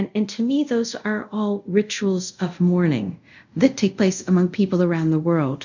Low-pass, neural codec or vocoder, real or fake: 7.2 kHz; codec, 24 kHz, 0.5 kbps, DualCodec; fake